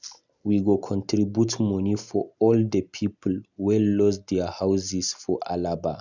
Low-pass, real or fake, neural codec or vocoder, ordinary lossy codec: 7.2 kHz; real; none; none